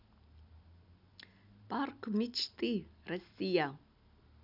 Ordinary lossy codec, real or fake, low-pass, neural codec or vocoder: none; real; 5.4 kHz; none